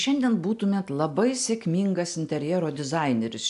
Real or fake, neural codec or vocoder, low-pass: real; none; 10.8 kHz